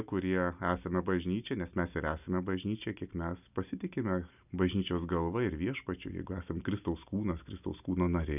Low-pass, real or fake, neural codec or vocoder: 3.6 kHz; real; none